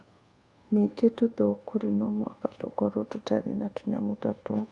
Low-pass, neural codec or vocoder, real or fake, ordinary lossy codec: 10.8 kHz; codec, 24 kHz, 1.2 kbps, DualCodec; fake; Opus, 64 kbps